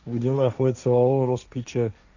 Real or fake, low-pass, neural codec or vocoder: fake; 7.2 kHz; codec, 16 kHz, 1.1 kbps, Voila-Tokenizer